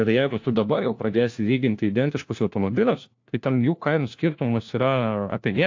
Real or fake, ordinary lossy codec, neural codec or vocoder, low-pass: fake; AAC, 48 kbps; codec, 16 kHz, 1 kbps, FunCodec, trained on LibriTTS, 50 frames a second; 7.2 kHz